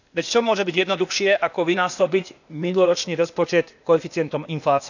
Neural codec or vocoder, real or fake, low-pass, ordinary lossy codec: codec, 16 kHz, 0.8 kbps, ZipCodec; fake; 7.2 kHz; none